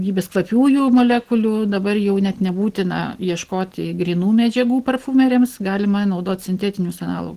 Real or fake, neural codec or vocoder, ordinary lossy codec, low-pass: real; none; Opus, 16 kbps; 14.4 kHz